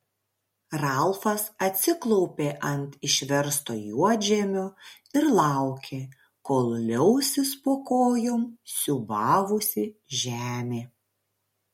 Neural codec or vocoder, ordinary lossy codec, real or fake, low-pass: none; MP3, 64 kbps; real; 19.8 kHz